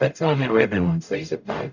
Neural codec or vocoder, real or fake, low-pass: codec, 44.1 kHz, 0.9 kbps, DAC; fake; 7.2 kHz